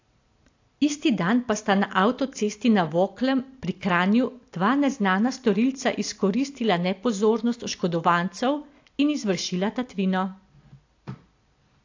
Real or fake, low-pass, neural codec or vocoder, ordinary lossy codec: real; 7.2 kHz; none; AAC, 48 kbps